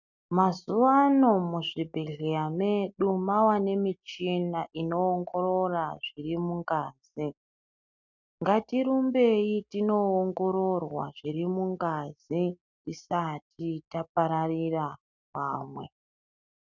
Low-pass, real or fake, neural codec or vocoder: 7.2 kHz; real; none